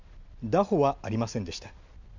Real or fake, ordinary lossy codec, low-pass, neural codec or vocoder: real; none; 7.2 kHz; none